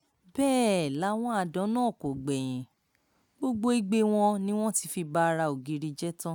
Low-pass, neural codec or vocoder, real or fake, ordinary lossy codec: none; none; real; none